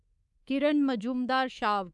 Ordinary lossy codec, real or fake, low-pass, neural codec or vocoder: none; fake; none; codec, 24 kHz, 3.1 kbps, DualCodec